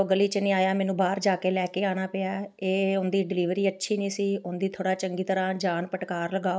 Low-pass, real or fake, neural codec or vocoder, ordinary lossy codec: none; real; none; none